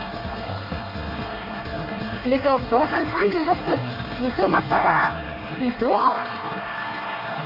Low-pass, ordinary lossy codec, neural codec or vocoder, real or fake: 5.4 kHz; none; codec, 24 kHz, 1 kbps, SNAC; fake